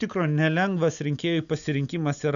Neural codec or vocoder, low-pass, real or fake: none; 7.2 kHz; real